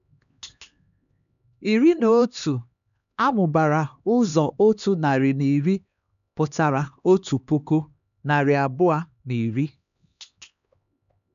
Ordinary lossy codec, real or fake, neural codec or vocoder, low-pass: none; fake; codec, 16 kHz, 2 kbps, X-Codec, HuBERT features, trained on LibriSpeech; 7.2 kHz